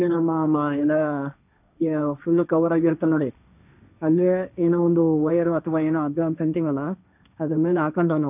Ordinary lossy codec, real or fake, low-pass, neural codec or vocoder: none; fake; 3.6 kHz; codec, 16 kHz, 1.1 kbps, Voila-Tokenizer